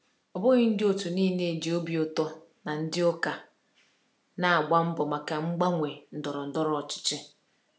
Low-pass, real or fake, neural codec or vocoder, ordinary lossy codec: none; real; none; none